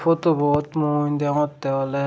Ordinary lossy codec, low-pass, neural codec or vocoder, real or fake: none; none; none; real